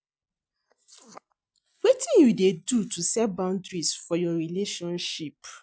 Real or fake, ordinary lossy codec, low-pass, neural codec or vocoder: real; none; none; none